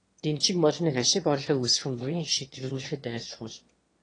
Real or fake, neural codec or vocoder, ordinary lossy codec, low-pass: fake; autoencoder, 22.05 kHz, a latent of 192 numbers a frame, VITS, trained on one speaker; AAC, 32 kbps; 9.9 kHz